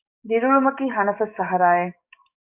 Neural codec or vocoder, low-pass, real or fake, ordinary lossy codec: codec, 44.1 kHz, 7.8 kbps, DAC; 3.6 kHz; fake; Opus, 64 kbps